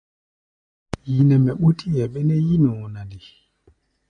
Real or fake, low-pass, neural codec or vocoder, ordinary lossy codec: real; 9.9 kHz; none; MP3, 64 kbps